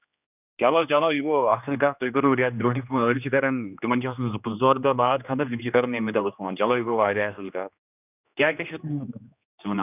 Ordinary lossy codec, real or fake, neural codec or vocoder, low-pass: none; fake; codec, 16 kHz, 2 kbps, X-Codec, HuBERT features, trained on general audio; 3.6 kHz